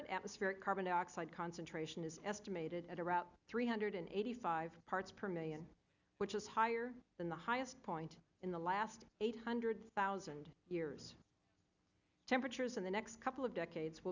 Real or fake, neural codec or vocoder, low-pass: real; none; 7.2 kHz